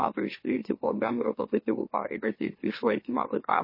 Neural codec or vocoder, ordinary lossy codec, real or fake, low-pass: autoencoder, 44.1 kHz, a latent of 192 numbers a frame, MeloTTS; MP3, 24 kbps; fake; 5.4 kHz